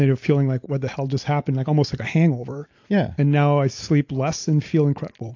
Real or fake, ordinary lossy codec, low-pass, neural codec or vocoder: real; AAC, 48 kbps; 7.2 kHz; none